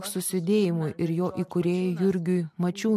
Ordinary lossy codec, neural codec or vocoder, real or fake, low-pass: MP3, 64 kbps; none; real; 14.4 kHz